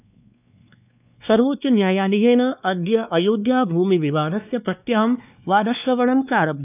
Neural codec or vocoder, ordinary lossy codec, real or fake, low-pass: codec, 16 kHz, 2 kbps, X-Codec, WavLM features, trained on Multilingual LibriSpeech; none; fake; 3.6 kHz